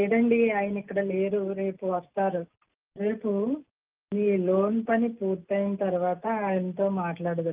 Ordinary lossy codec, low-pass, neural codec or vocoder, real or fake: Opus, 32 kbps; 3.6 kHz; none; real